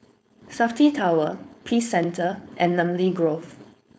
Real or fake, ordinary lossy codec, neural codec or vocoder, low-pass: fake; none; codec, 16 kHz, 4.8 kbps, FACodec; none